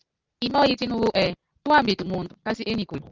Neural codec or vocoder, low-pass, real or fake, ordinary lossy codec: none; 7.2 kHz; real; Opus, 32 kbps